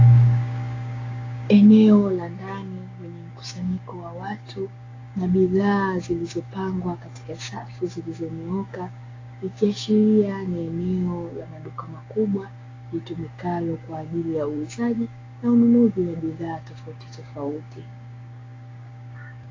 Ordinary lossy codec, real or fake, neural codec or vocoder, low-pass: AAC, 32 kbps; fake; codec, 16 kHz, 6 kbps, DAC; 7.2 kHz